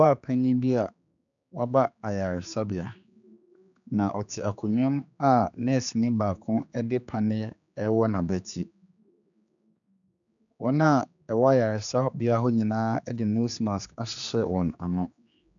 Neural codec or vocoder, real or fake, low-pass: codec, 16 kHz, 2 kbps, X-Codec, HuBERT features, trained on general audio; fake; 7.2 kHz